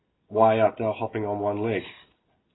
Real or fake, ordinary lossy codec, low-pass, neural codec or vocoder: real; AAC, 16 kbps; 7.2 kHz; none